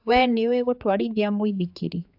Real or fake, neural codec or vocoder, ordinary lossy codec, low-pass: fake; codec, 16 kHz, 4 kbps, X-Codec, HuBERT features, trained on general audio; AAC, 48 kbps; 5.4 kHz